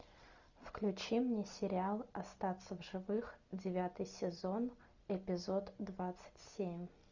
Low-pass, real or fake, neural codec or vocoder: 7.2 kHz; real; none